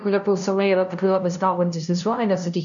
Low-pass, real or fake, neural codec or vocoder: 7.2 kHz; fake; codec, 16 kHz, 0.5 kbps, FunCodec, trained on LibriTTS, 25 frames a second